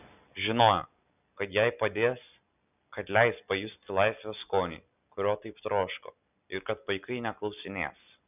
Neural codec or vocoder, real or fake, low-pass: none; real; 3.6 kHz